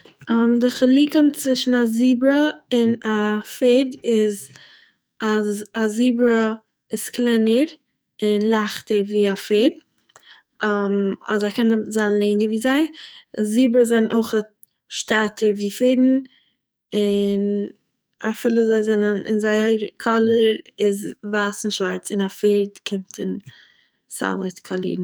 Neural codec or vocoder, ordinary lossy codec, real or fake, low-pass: codec, 44.1 kHz, 2.6 kbps, SNAC; none; fake; none